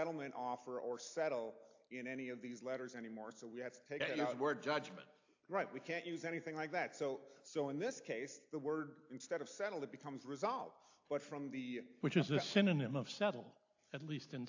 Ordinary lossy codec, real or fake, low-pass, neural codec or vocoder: AAC, 48 kbps; real; 7.2 kHz; none